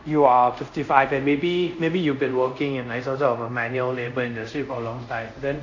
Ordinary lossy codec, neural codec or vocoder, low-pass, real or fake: none; codec, 24 kHz, 0.5 kbps, DualCodec; 7.2 kHz; fake